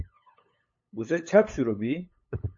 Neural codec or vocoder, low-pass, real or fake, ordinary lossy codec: codec, 16 kHz, 8 kbps, FunCodec, trained on LibriTTS, 25 frames a second; 7.2 kHz; fake; MP3, 32 kbps